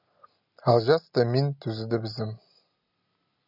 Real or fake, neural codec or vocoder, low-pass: real; none; 5.4 kHz